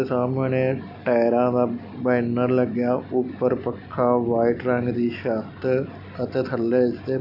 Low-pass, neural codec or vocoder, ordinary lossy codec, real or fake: 5.4 kHz; none; none; real